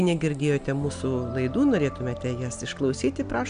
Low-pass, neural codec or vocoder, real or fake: 9.9 kHz; none; real